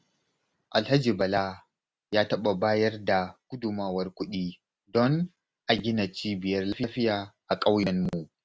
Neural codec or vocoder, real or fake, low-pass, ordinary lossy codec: none; real; none; none